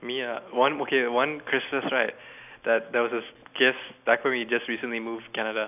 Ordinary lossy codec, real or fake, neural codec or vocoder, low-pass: none; real; none; 3.6 kHz